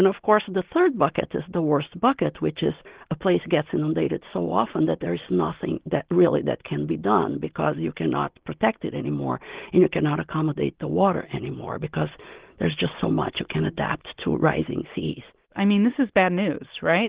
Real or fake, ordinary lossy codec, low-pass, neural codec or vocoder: real; Opus, 16 kbps; 3.6 kHz; none